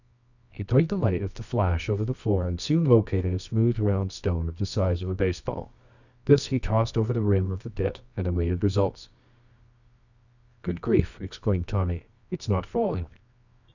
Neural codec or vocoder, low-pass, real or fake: codec, 24 kHz, 0.9 kbps, WavTokenizer, medium music audio release; 7.2 kHz; fake